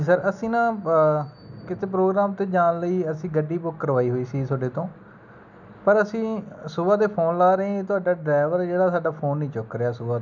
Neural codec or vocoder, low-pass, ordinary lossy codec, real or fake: none; 7.2 kHz; none; real